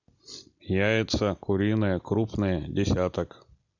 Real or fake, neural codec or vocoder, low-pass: real; none; 7.2 kHz